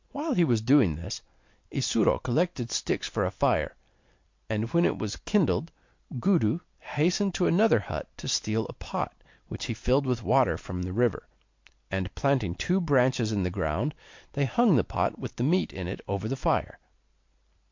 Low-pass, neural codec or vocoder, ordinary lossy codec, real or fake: 7.2 kHz; none; MP3, 48 kbps; real